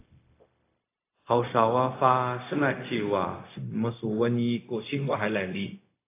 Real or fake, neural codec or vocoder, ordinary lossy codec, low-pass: fake; codec, 16 kHz, 0.4 kbps, LongCat-Audio-Codec; AAC, 24 kbps; 3.6 kHz